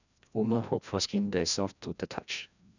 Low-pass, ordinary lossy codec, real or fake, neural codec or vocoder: 7.2 kHz; none; fake; codec, 16 kHz, 1 kbps, FreqCodec, larger model